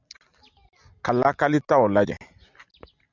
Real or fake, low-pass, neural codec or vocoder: real; 7.2 kHz; none